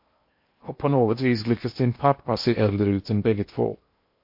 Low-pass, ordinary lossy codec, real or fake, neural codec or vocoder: 5.4 kHz; MP3, 32 kbps; fake; codec, 16 kHz in and 24 kHz out, 0.8 kbps, FocalCodec, streaming, 65536 codes